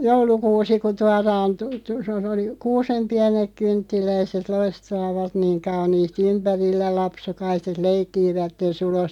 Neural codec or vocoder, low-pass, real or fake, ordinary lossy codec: none; 19.8 kHz; real; none